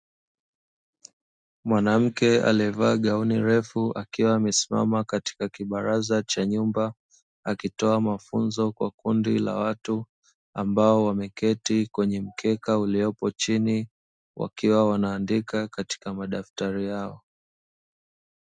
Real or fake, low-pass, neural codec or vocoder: real; 9.9 kHz; none